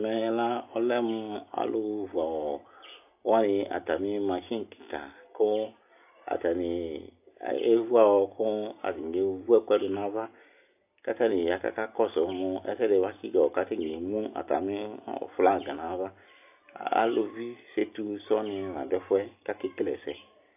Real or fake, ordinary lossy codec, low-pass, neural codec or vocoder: real; AAC, 32 kbps; 3.6 kHz; none